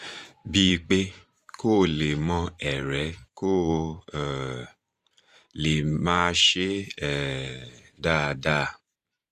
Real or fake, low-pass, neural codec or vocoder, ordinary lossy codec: fake; 14.4 kHz; vocoder, 44.1 kHz, 128 mel bands every 512 samples, BigVGAN v2; Opus, 64 kbps